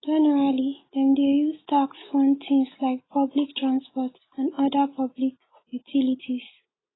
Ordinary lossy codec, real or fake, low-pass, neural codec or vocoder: AAC, 16 kbps; real; 7.2 kHz; none